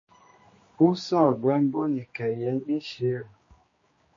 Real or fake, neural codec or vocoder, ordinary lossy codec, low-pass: fake; codec, 16 kHz, 2 kbps, X-Codec, HuBERT features, trained on general audio; MP3, 32 kbps; 7.2 kHz